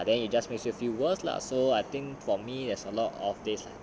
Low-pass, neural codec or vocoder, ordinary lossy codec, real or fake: none; none; none; real